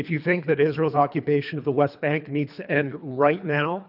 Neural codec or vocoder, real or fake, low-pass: codec, 24 kHz, 3 kbps, HILCodec; fake; 5.4 kHz